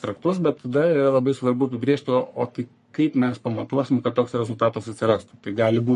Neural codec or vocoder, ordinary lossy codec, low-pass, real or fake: codec, 44.1 kHz, 3.4 kbps, Pupu-Codec; MP3, 48 kbps; 14.4 kHz; fake